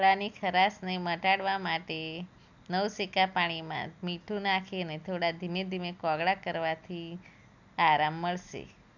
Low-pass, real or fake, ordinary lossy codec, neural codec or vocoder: 7.2 kHz; real; none; none